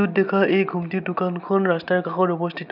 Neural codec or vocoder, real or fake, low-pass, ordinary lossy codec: none; real; 5.4 kHz; none